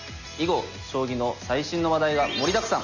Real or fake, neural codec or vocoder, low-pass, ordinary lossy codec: real; none; 7.2 kHz; none